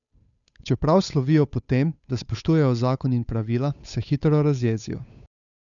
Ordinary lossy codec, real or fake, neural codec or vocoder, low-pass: none; fake; codec, 16 kHz, 8 kbps, FunCodec, trained on Chinese and English, 25 frames a second; 7.2 kHz